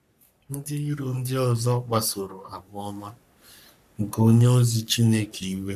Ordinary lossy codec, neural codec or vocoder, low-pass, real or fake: none; codec, 44.1 kHz, 3.4 kbps, Pupu-Codec; 14.4 kHz; fake